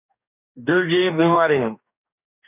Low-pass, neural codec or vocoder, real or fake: 3.6 kHz; codec, 44.1 kHz, 2.6 kbps, DAC; fake